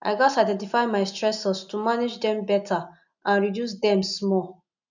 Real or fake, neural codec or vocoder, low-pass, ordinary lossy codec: real; none; 7.2 kHz; none